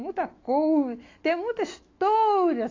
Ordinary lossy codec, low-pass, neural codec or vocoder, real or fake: AAC, 32 kbps; 7.2 kHz; autoencoder, 48 kHz, 128 numbers a frame, DAC-VAE, trained on Japanese speech; fake